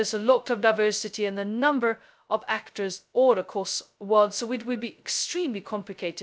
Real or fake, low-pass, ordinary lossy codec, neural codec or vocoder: fake; none; none; codec, 16 kHz, 0.2 kbps, FocalCodec